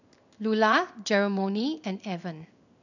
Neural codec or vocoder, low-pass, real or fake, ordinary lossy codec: codec, 16 kHz in and 24 kHz out, 1 kbps, XY-Tokenizer; 7.2 kHz; fake; none